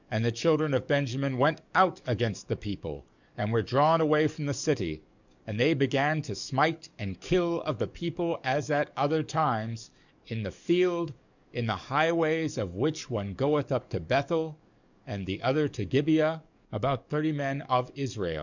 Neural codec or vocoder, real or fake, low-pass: codec, 44.1 kHz, 7.8 kbps, DAC; fake; 7.2 kHz